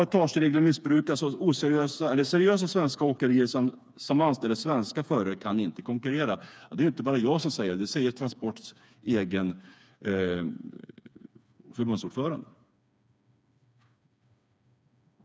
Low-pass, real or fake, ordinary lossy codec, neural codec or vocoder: none; fake; none; codec, 16 kHz, 4 kbps, FreqCodec, smaller model